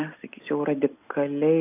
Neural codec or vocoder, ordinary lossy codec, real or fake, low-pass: none; AAC, 32 kbps; real; 3.6 kHz